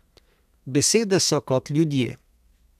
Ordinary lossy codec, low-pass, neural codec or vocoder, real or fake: none; 14.4 kHz; codec, 32 kHz, 1.9 kbps, SNAC; fake